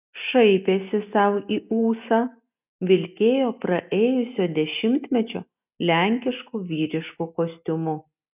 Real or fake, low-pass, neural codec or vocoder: real; 3.6 kHz; none